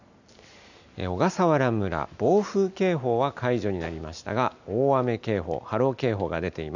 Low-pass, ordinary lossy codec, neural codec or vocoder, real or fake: 7.2 kHz; none; none; real